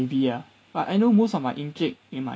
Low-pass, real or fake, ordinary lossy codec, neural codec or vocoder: none; real; none; none